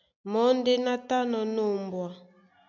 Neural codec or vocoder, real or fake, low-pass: none; real; 7.2 kHz